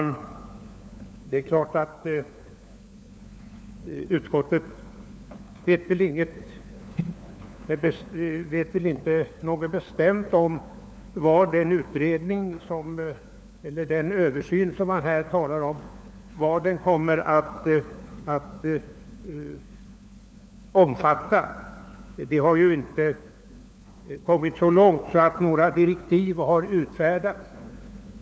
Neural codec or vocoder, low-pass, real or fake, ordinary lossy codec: codec, 16 kHz, 4 kbps, FunCodec, trained on LibriTTS, 50 frames a second; none; fake; none